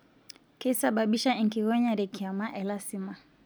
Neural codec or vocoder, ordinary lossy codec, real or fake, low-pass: none; none; real; none